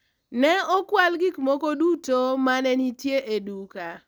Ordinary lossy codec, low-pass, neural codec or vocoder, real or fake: none; none; none; real